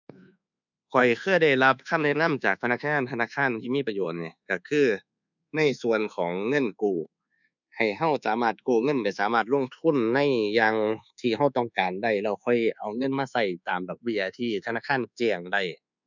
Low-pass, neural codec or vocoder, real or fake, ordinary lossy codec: 7.2 kHz; codec, 24 kHz, 1.2 kbps, DualCodec; fake; none